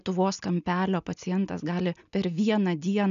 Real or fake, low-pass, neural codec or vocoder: real; 7.2 kHz; none